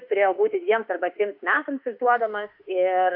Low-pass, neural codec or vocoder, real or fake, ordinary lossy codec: 5.4 kHz; autoencoder, 48 kHz, 32 numbers a frame, DAC-VAE, trained on Japanese speech; fake; AAC, 48 kbps